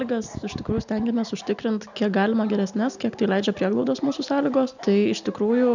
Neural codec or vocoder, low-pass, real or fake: none; 7.2 kHz; real